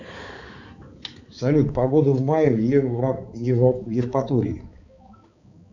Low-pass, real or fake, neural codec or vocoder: 7.2 kHz; fake; codec, 16 kHz, 4 kbps, X-Codec, HuBERT features, trained on balanced general audio